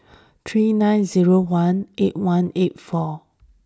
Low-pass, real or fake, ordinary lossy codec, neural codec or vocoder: none; real; none; none